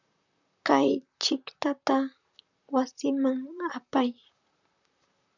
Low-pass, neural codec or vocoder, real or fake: 7.2 kHz; vocoder, 44.1 kHz, 128 mel bands, Pupu-Vocoder; fake